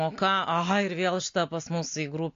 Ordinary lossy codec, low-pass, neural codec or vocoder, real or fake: AAC, 48 kbps; 7.2 kHz; none; real